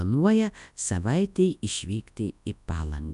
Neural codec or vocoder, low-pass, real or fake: codec, 24 kHz, 0.9 kbps, WavTokenizer, large speech release; 10.8 kHz; fake